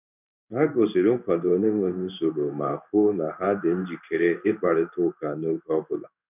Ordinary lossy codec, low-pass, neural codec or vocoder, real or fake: none; 3.6 kHz; codec, 16 kHz in and 24 kHz out, 1 kbps, XY-Tokenizer; fake